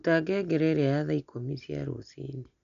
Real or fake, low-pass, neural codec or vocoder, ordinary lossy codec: real; 7.2 kHz; none; none